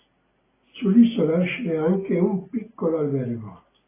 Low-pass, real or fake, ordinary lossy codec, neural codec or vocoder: 3.6 kHz; real; AAC, 16 kbps; none